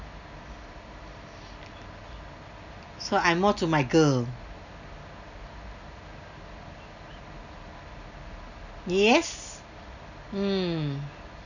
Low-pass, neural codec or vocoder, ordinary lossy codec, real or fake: 7.2 kHz; none; none; real